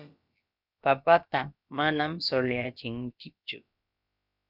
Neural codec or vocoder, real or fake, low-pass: codec, 16 kHz, about 1 kbps, DyCAST, with the encoder's durations; fake; 5.4 kHz